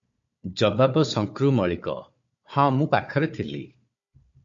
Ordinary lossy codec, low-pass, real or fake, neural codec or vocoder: MP3, 48 kbps; 7.2 kHz; fake; codec, 16 kHz, 4 kbps, FunCodec, trained on Chinese and English, 50 frames a second